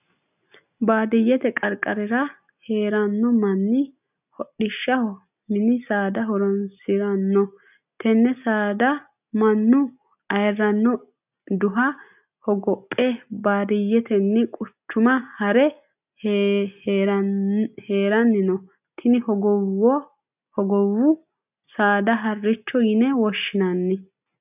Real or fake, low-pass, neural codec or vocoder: real; 3.6 kHz; none